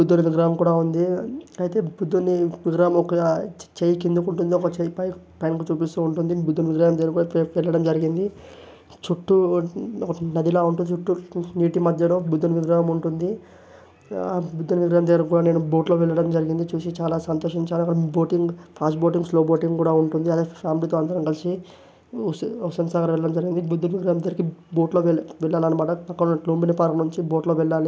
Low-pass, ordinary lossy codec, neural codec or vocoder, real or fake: none; none; none; real